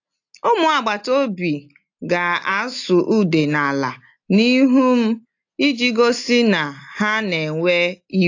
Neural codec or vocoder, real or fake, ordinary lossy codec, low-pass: none; real; AAC, 48 kbps; 7.2 kHz